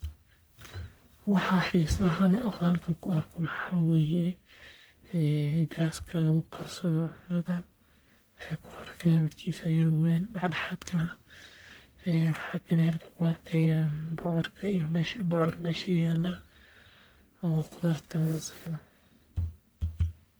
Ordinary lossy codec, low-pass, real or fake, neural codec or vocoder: none; none; fake; codec, 44.1 kHz, 1.7 kbps, Pupu-Codec